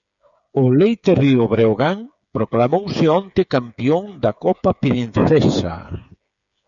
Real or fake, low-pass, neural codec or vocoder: fake; 7.2 kHz; codec, 16 kHz, 8 kbps, FreqCodec, smaller model